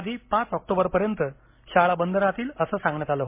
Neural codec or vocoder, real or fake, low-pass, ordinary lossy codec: none; real; 3.6 kHz; MP3, 24 kbps